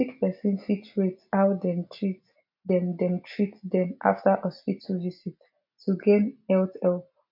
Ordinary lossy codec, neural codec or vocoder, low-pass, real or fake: MP3, 48 kbps; none; 5.4 kHz; real